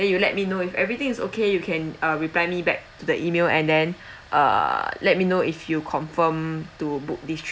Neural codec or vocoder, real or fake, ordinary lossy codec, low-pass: none; real; none; none